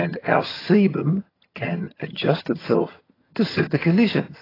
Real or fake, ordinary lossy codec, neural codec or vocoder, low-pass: fake; AAC, 24 kbps; vocoder, 22.05 kHz, 80 mel bands, HiFi-GAN; 5.4 kHz